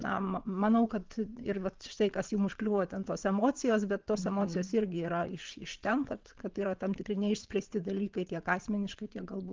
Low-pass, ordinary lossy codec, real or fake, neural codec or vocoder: 7.2 kHz; Opus, 32 kbps; fake; vocoder, 22.05 kHz, 80 mel bands, WaveNeXt